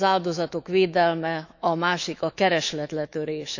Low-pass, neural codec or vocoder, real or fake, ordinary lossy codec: 7.2 kHz; autoencoder, 48 kHz, 128 numbers a frame, DAC-VAE, trained on Japanese speech; fake; none